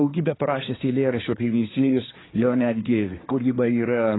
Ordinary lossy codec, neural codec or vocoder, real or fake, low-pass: AAC, 16 kbps; codec, 24 kHz, 1 kbps, SNAC; fake; 7.2 kHz